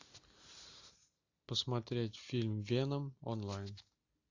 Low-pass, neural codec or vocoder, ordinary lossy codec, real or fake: 7.2 kHz; none; AAC, 48 kbps; real